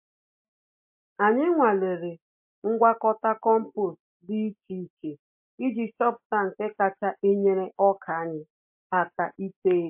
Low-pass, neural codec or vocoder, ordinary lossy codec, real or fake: 3.6 kHz; none; MP3, 32 kbps; real